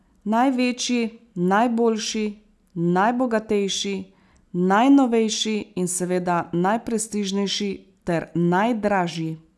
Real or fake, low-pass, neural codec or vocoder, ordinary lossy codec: real; none; none; none